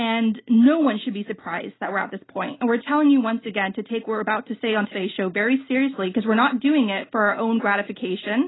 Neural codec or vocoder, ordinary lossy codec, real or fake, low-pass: none; AAC, 16 kbps; real; 7.2 kHz